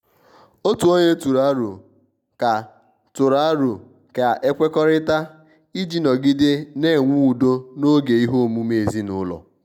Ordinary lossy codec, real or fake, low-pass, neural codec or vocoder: none; real; 19.8 kHz; none